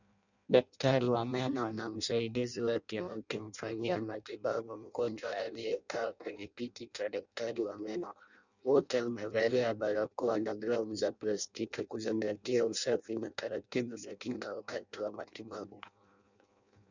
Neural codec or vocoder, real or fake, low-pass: codec, 16 kHz in and 24 kHz out, 0.6 kbps, FireRedTTS-2 codec; fake; 7.2 kHz